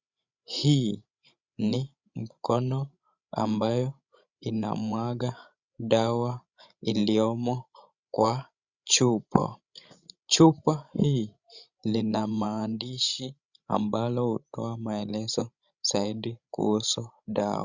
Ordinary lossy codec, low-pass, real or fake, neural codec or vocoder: Opus, 64 kbps; 7.2 kHz; fake; codec, 16 kHz, 16 kbps, FreqCodec, larger model